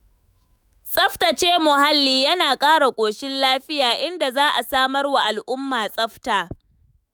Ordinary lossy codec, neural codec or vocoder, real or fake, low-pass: none; autoencoder, 48 kHz, 128 numbers a frame, DAC-VAE, trained on Japanese speech; fake; none